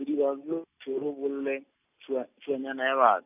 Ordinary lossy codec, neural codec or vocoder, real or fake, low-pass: none; none; real; 3.6 kHz